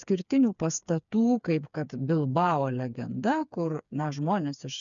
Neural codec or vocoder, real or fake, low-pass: codec, 16 kHz, 4 kbps, FreqCodec, smaller model; fake; 7.2 kHz